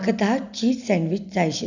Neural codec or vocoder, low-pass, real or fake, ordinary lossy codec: none; 7.2 kHz; real; none